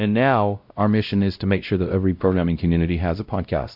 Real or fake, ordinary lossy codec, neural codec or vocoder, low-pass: fake; MP3, 48 kbps; codec, 16 kHz, 0.5 kbps, X-Codec, WavLM features, trained on Multilingual LibriSpeech; 5.4 kHz